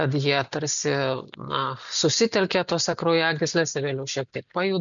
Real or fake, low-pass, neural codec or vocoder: real; 7.2 kHz; none